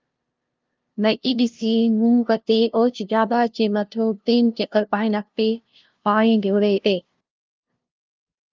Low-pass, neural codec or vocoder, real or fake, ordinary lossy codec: 7.2 kHz; codec, 16 kHz, 0.5 kbps, FunCodec, trained on LibriTTS, 25 frames a second; fake; Opus, 24 kbps